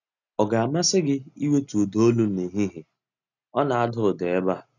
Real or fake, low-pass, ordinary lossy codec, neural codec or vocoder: real; 7.2 kHz; none; none